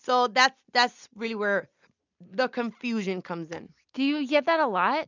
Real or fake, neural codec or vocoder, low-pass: real; none; 7.2 kHz